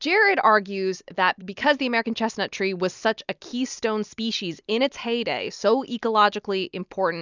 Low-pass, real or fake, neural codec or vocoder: 7.2 kHz; real; none